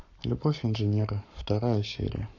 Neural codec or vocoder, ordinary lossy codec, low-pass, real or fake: codec, 44.1 kHz, 7.8 kbps, Pupu-Codec; none; 7.2 kHz; fake